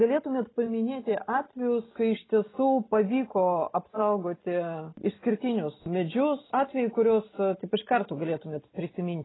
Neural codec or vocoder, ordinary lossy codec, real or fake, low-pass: none; AAC, 16 kbps; real; 7.2 kHz